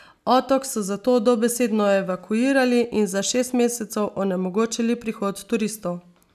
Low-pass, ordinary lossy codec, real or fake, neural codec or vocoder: 14.4 kHz; none; real; none